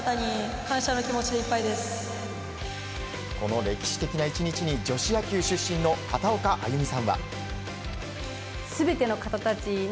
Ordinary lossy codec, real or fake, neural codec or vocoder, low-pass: none; real; none; none